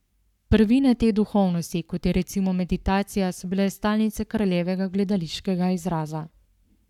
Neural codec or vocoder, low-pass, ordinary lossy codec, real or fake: codec, 44.1 kHz, 7.8 kbps, Pupu-Codec; 19.8 kHz; none; fake